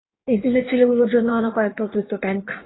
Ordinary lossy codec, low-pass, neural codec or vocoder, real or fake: AAC, 16 kbps; 7.2 kHz; codec, 16 kHz in and 24 kHz out, 1.1 kbps, FireRedTTS-2 codec; fake